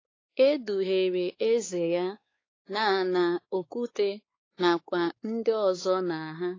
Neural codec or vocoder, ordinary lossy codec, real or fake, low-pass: codec, 16 kHz, 4 kbps, X-Codec, WavLM features, trained on Multilingual LibriSpeech; AAC, 32 kbps; fake; 7.2 kHz